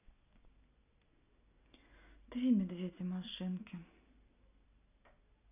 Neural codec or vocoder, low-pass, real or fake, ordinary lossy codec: none; 3.6 kHz; real; none